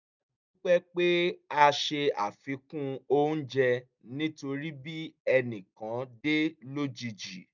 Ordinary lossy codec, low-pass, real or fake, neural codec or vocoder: none; 7.2 kHz; real; none